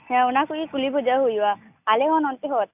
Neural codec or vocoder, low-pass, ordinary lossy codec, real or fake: none; 3.6 kHz; AAC, 32 kbps; real